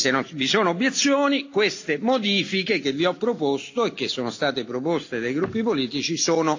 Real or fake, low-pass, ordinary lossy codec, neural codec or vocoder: real; 7.2 kHz; AAC, 48 kbps; none